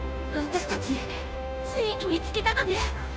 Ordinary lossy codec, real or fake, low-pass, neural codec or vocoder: none; fake; none; codec, 16 kHz, 0.5 kbps, FunCodec, trained on Chinese and English, 25 frames a second